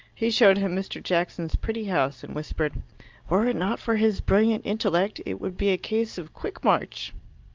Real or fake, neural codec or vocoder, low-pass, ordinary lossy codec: real; none; 7.2 kHz; Opus, 24 kbps